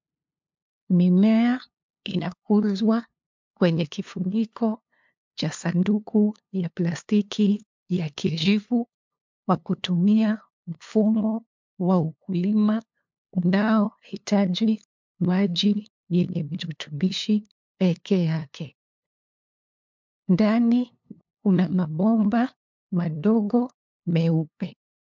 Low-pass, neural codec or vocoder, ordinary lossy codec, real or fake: 7.2 kHz; codec, 16 kHz, 2 kbps, FunCodec, trained on LibriTTS, 25 frames a second; MP3, 64 kbps; fake